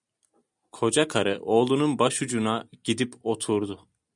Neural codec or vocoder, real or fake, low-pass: none; real; 10.8 kHz